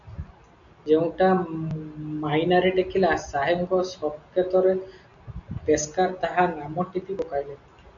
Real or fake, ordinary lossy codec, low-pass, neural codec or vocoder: real; MP3, 96 kbps; 7.2 kHz; none